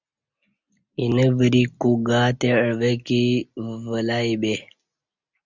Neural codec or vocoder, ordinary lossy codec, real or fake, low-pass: none; Opus, 64 kbps; real; 7.2 kHz